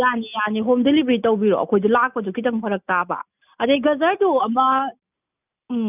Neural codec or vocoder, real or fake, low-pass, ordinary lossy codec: none; real; 3.6 kHz; none